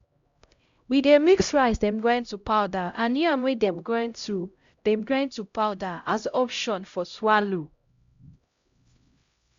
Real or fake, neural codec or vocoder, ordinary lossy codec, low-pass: fake; codec, 16 kHz, 0.5 kbps, X-Codec, HuBERT features, trained on LibriSpeech; Opus, 64 kbps; 7.2 kHz